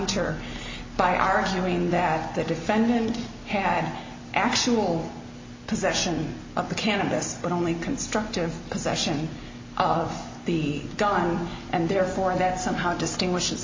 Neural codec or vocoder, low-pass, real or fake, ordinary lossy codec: none; 7.2 kHz; real; MP3, 32 kbps